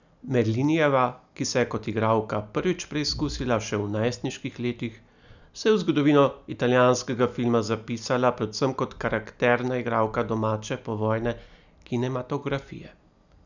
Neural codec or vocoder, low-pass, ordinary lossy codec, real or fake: none; 7.2 kHz; none; real